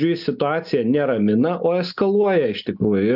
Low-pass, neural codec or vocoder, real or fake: 5.4 kHz; none; real